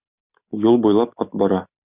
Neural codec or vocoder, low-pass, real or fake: none; 3.6 kHz; real